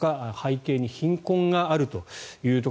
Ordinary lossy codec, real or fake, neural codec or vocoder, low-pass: none; real; none; none